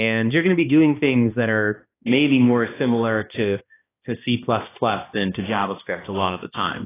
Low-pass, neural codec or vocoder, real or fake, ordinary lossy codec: 3.6 kHz; codec, 16 kHz, 1 kbps, X-Codec, HuBERT features, trained on balanced general audio; fake; AAC, 16 kbps